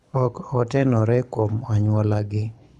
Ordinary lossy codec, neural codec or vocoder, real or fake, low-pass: none; codec, 24 kHz, 6 kbps, HILCodec; fake; none